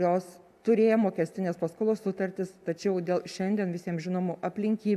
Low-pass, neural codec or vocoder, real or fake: 14.4 kHz; none; real